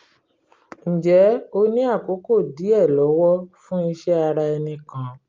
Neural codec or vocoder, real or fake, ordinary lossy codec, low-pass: none; real; Opus, 32 kbps; 7.2 kHz